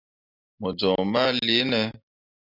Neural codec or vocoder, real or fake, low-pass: none; real; 5.4 kHz